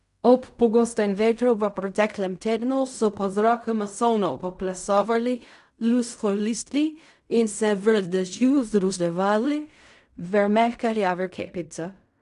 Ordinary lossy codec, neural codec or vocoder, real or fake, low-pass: none; codec, 16 kHz in and 24 kHz out, 0.4 kbps, LongCat-Audio-Codec, fine tuned four codebook decoder; fake; 10.8 kHz